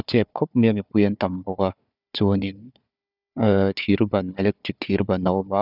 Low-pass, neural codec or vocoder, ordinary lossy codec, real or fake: 5.4 kHz; codec, 16 kHz, 4 kbps, FunCodec, trained on Chinese and English, 50 frames a second; none; fake